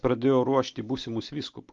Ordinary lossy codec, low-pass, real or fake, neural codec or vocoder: Opus, 32 kbps; 7.2 kHz; real; none